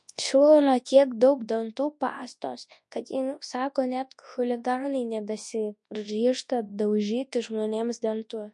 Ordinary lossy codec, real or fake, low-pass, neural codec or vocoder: MP3, 48 kbps; fake; 10.8 kHz; codec, 24 kHz, 0.9 kbps, WavTokenizer, large speech release